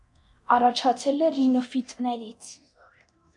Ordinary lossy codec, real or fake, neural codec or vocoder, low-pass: MP3, 96 kbps; fake; codec, 24 kHz, 0.9 kbps, DualCodec; 10.8 kHz